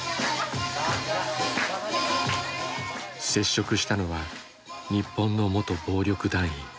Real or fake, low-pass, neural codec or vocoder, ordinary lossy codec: real; none; none; none